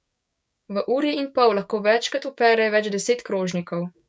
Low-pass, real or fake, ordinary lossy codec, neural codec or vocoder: none; fake; none; codec, 16 kHz, 6 kbps, DAC